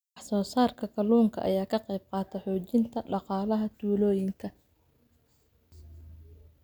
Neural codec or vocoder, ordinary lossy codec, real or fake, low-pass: none; none; real; none